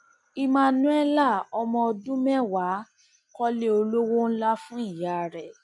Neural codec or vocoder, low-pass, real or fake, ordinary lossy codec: none; 10.8 kHz; real; none